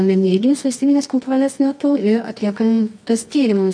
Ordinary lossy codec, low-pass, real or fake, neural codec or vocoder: MP3, 64 kbps; 9.9 kHz; fake; codec, 24 kHz, 0.9 kbps, WavTokenizer, medium music audio release